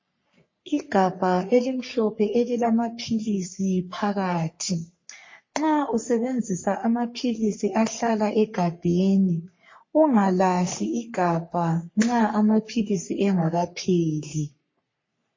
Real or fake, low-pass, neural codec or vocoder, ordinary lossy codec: fake; 7.2 kHz; codec, 44.1 kHz, 3.4 kbps, Pupu-Codec; MP3, 32 kbps